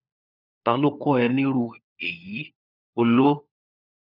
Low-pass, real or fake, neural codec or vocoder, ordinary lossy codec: 5.4 kHz; fake; codec, 16 kHz, 4 kbps, FunCodec, trained on LibriTTS, 50 frames a second; none